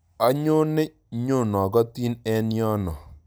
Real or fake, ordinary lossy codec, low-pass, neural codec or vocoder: real; none; none; none